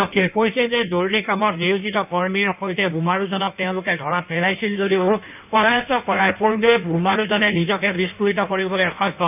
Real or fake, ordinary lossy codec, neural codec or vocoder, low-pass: fake; none; codec, 16 kHz in and 24 kHz out, 1.1 kbps, FireRedTTS-2 codec; 3.6 kHz